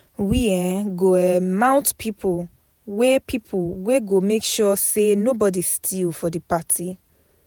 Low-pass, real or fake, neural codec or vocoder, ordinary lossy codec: none; fake; vocoder, 48 kHz, 128 mel bands, Vocos; none